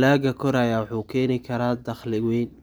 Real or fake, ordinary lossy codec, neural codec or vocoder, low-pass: real; none; none; none